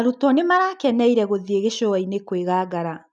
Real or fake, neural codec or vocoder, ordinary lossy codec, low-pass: real; none; none; 10.8 kHz